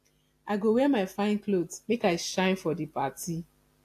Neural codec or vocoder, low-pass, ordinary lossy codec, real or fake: none; 14.4 kHz; AAC, 64 kbps; real